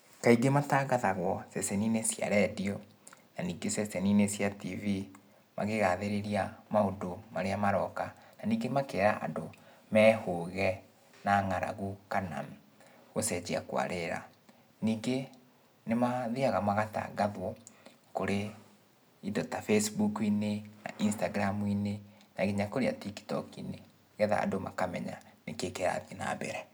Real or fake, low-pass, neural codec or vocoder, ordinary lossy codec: real; none; none; none